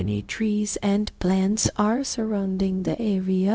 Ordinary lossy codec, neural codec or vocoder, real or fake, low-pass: none; codec, 16 kHz, 0.4 kbps, LongCat-Audio-Codec; fake; none